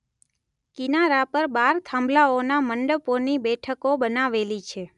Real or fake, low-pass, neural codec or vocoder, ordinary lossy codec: real; 10.8 kHz; none; none